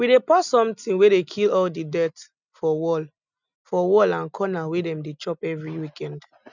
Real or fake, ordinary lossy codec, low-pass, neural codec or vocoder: real; none; 7.2 kHz; none